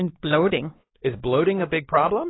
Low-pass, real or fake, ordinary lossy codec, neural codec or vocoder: 7.2 kHz; fake; AAC, 16 kbps; codec, 16 kHz, 4 kbps, X-Codec, WavLM features, trained on Multilingual LibriSpeech